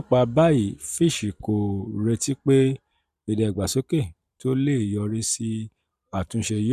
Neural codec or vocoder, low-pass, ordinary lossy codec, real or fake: vocoder, 44.1 kHz, 128 mel bands every 512 samples, BigVGAN v2; 14.4 kHz; none; fake